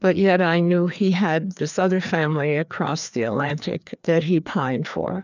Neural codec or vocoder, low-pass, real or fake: codec, 16 kHz, 2 kbps, FreqCodec, larger model; 7.2 kHz; fake